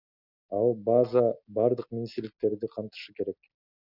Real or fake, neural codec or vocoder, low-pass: real; none; 5.4 kHz